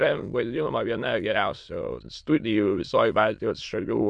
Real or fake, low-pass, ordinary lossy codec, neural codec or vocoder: fake; 9.9 kHz; MP3, 64 kbps; autoencoder, 22.05 kHz, a latent of 192 numbers a frame, VITS, trained on many speakers